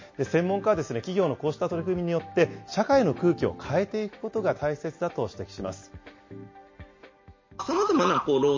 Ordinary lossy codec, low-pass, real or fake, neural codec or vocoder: MP3, 32 kbps; 7.2 kHz; real; none